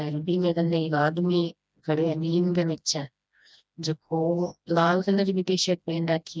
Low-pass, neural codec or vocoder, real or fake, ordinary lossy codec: none; codec, 16 kHz, 1 kbps, FreqCodec, smaller model; fake; none